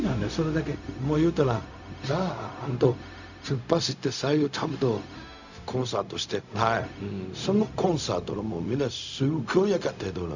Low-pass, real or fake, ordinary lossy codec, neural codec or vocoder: 7.2 kHz; fake; none; codec, 16 kHz, 0.4 kbps, LongCat-Audio-Codec